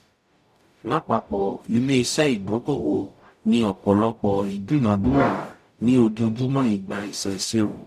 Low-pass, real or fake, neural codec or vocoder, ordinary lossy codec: 14.4 kHz; fake; codec, 44.1 kHz, 0.9 kbps, DAC; none